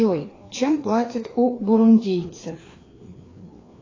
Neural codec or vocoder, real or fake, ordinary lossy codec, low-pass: codec, 16 kHz, 2 kbps, FreqCodec, larger model; fake; AAC, 32 kbps; 7.2 kHz